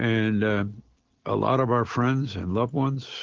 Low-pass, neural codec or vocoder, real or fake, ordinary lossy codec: 7.2 kHz; none; real; Opus, 16 kbps